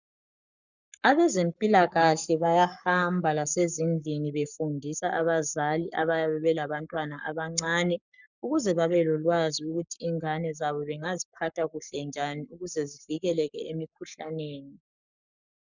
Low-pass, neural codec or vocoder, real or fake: 7.2 kHz; codec, 44.1 kHz, 7.8 kbps, Pupu-Codec; fake